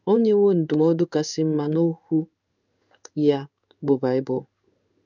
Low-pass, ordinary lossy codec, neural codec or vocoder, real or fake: 7.2 kHz; none; codec, 16 kHz in and 24 kHz out, 1 kbps, XY-Tokenizer; fake